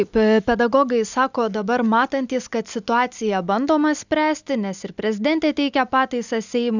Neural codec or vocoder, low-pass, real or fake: none; 7.2 kHz; real